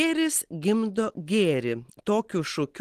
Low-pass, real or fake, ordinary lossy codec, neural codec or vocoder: 14.4 kHz; real; Opus, 16 kbps; none